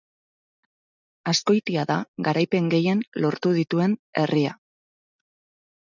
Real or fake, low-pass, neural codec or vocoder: real; 7.2 kHz; none